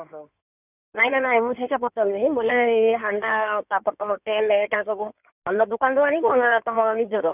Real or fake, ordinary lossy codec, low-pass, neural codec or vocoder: fake; none; 3.6 kHz; codec, 16 kHz in and 24 kHz out, 2.2 kbps, FireRedTTS-2 codec